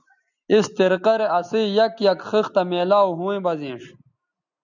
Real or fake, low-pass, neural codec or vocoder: real; 7.2 kHz; none